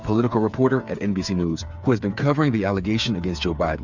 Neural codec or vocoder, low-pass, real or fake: codec, 16 kHz, 8 kbps, FreqCodec, smaller model; 7.2 kHz; fake